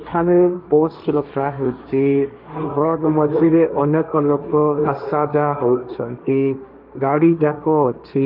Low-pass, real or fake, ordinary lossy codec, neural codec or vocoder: 5.4 kHz; fake; none; codec, 16 kHz, 1.1 kbps, Voila-Tokenizer